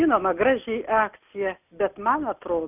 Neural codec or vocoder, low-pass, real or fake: none; 3.6 kHz; real